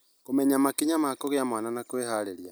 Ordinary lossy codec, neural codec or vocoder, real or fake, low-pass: none; none; real; none